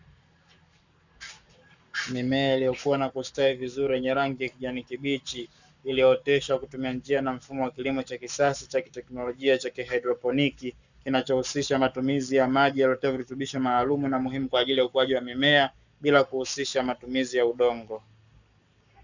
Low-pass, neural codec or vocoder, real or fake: 7.2 kHz; codec, 44.1 kHz, 7.8 kbps, Pupu-Codec; fake